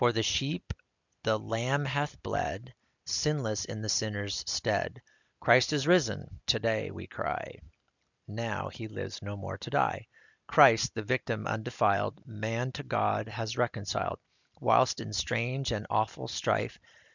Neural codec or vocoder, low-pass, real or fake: none; 7.2 kHz; real